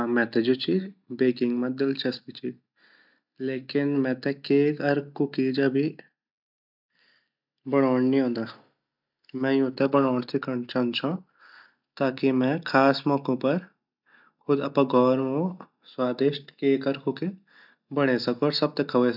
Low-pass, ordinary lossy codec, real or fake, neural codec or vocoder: 5.4 kHz; none; real; none